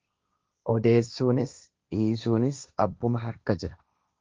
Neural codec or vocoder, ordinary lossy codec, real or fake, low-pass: codec, 16 kHz, 1.1 kbps, Voila-Tokenizer; Opus, 24 kbps; fake; 7.2 kHz